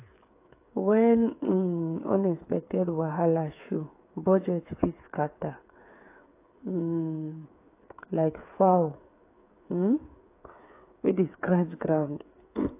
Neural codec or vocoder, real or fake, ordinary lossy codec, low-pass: codec, 16 kHz, 8 kbps, FreqCodec, smaller model; fake; AAC, 24 kbps; 3.6 kHz